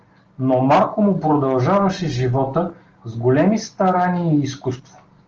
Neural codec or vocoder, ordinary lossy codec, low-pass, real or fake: none; Opus, 16 kbps; 7.2 kHz; real